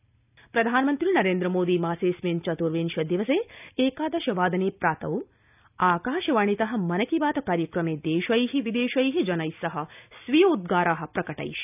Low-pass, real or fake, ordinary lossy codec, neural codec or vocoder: 3.6 kHz; real; none; none